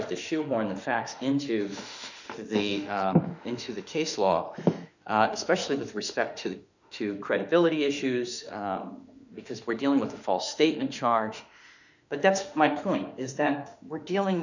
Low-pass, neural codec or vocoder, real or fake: 7.2 kHz; autoencoder, 48 kHz, 32 numbers a frame, DAC-VAE, trained on Japanese speech; fake